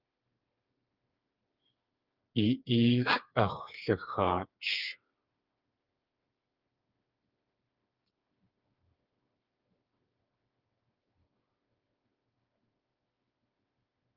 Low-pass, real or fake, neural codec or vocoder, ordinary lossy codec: 5.4 kHz; fake; codec, 16 kHz, 4 kbps, FreqCodec, smaller model; Opus, 24 kbps